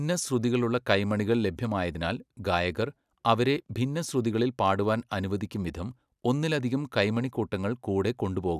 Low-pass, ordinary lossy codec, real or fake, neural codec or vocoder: 14.4 kHz; none; real; none